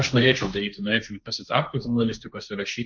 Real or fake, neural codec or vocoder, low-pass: fake; codec, 16 kHz, 1.1 kbps, Voila-Tokenizer; 7.2 kHz